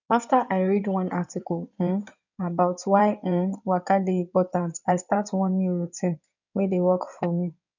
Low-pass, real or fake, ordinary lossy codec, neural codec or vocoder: 7.2 kHz; fake; none; codec, 16 kHz in and 24 kHz out, 2.2 kbps, FireRedTTS-2 codec